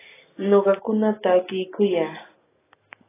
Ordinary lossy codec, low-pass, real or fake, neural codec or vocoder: AAC, 16 kbps; 3.6 kHz; real; none